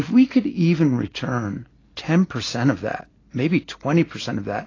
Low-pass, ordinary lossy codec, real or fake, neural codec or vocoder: 7.2 kHz; AAC, 32 kbps; real; none